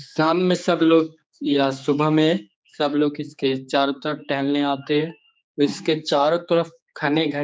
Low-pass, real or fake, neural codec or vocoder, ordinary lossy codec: none; fake; codec, 16 kHz, 4 kbps, X-Codec, HuBERT features, trained on general audio; none